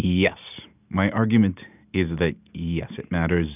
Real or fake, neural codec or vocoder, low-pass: real; none; 3.6 kHz